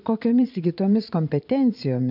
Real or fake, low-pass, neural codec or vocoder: fake; 5.4 kHz; vocoder, 22.05 kHz, 80 mel bands, Vocos